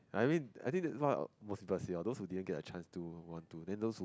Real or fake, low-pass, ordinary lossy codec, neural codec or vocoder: real; none; none; none